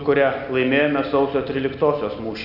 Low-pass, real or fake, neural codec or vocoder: 5.4 kHz; real; none